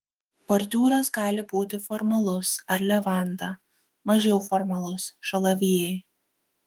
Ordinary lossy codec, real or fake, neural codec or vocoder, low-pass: Opus, 24 kbps; fake; autoencoder, 48 kHz, 32 numbers a frame, DAC-VAE, trained on Japanese speech; 19.8 kHz